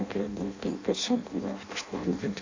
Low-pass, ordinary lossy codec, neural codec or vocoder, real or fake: 7.2 kHz; AAC, 48 kbps; codec, 16 kHz in and 24 kHz out, 0.6 kbps, FireRedTTS-2 codec; fake